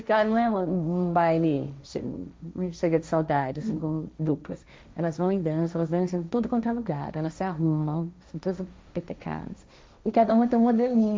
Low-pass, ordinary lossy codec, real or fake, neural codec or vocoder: none; none; fake; codec, 16 kHz, 1.1 kbps, Voila-Tokenizer